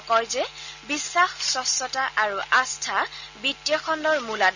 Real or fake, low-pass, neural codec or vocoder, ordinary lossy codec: real; 7.2 kHz; none; none